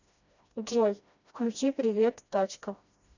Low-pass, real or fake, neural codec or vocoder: 7.2 kHz; fake; codec, 16 kHz, 1 kbps, FreqCodec, smaller model